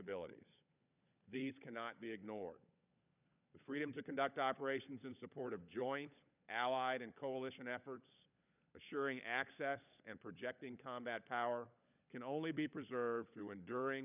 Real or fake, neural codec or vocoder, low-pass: fake; codec, 16 kHz, 16 kbps, FunCodec, trained on LibriTTS, 50 frames a second; 3.6 kHz